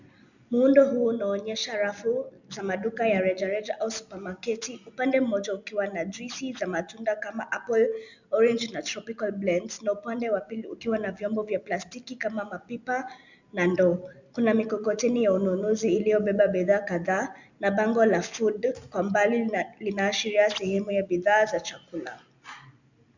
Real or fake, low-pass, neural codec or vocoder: real; 7.2 kHz; none